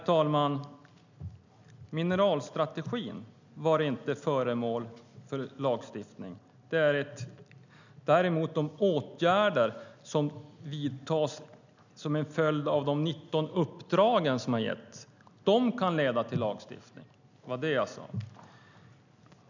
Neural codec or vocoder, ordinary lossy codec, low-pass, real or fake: none; none; 7.2 kHz; real